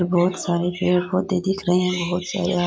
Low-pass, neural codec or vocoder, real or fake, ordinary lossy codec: none; none; real; none